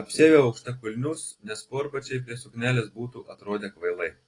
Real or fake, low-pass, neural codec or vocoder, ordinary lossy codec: real; 10.8 kHz; none; AAC, 32 kbps